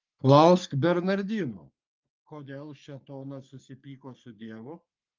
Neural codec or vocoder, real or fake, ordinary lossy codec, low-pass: codec, 44.1 kHz, 3.4 kbps, Pupu-Codec; fake; Opus, 24 kbps; 7.2 kHz